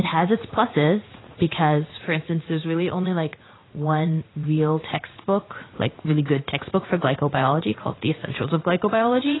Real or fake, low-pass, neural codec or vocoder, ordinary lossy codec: fake; 7.2 kHz; vocoder, 44.1 kHz, 80 mel bands, Vocos; AAC, 16 kbps